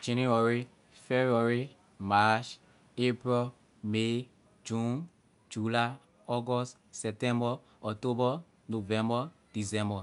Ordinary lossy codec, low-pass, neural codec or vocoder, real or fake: none; 10.8 kHz; none; real